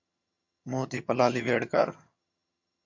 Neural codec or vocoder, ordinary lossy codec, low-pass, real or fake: vocoder, 22.05 kHz, 80 mel bands, HiFi-GAN; MP3, 48 kbps; 7.2 kHz; fake